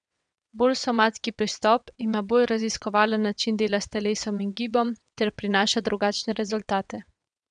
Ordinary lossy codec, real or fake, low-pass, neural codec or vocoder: none; fake; 9.9 kHz; vocoder, 22.05 kHz, 80 mel bands, WaveNeXt